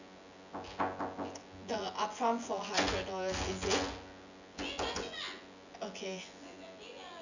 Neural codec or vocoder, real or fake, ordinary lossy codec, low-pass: vocoder, 24 kHz, 100 mel bands, Vocos; fake; none; 7.2 kHz